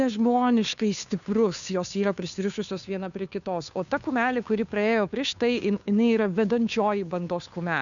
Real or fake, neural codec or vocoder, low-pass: fake; codec, 16 kHz, 2 kbps, FunCodec, trained on Chinese and English, 25 frames a second; 7.2 kHz